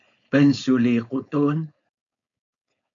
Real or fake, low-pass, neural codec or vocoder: fake; 7.2 kHz; codec, 16 kHz, 4.8 kbps, FACodec